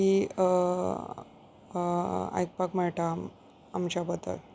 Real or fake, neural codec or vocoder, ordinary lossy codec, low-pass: real; none; none; none